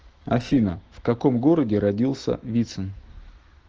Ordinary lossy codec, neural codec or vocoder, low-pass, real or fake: Opus, 16 kbps; autoencoder, 48 kHz, 128 numbers a frame, DAC-VAE, trained on Japanese speech; 7.2 kHz; fake